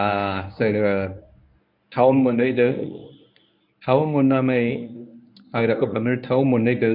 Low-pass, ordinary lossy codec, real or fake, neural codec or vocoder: 5.4 kHz; none; fake; codec, 24 kHz, 0.9 kbps, WavTokenizer, medium speech release version 1